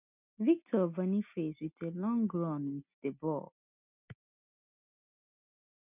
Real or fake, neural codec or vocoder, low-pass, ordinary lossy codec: real; none; 3.6 kHz; none